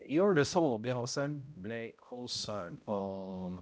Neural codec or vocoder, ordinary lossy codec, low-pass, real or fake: codec, 16 kHz, 0.5 kbps, X-Codec, HuBERT features, trained on balanced general audio; none; none; fake